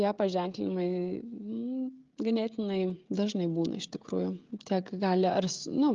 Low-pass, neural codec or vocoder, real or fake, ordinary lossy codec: 7.2 kHz; codec, 16 kHz, 16 kbps, FreqCodec, smaller model; fake; Opus, 32 kbps